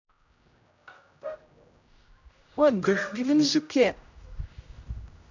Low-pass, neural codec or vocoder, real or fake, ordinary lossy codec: 7.2 kHz; codec, 16 kHz, 0.5 kbps, X-Codec, HuBERT features, trained on general audio; fake; none